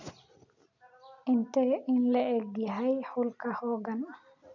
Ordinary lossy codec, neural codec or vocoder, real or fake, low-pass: none; none; real; 7.2 kHz